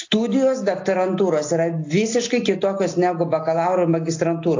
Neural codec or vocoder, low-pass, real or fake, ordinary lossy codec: none; 7.2 kHz; real; AAC, 48 kbps